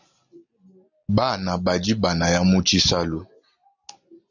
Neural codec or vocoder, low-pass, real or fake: none; 7.2 kHz; real